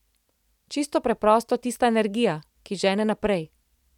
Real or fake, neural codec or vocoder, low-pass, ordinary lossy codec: real; none; 19.8 kHz; none